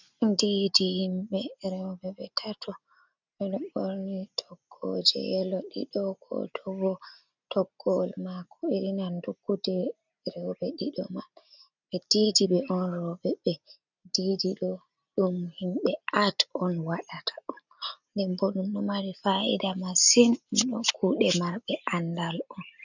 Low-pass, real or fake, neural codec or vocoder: 7.2 kHz; real; none